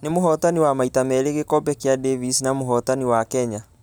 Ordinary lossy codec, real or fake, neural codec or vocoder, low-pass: none; real; none; none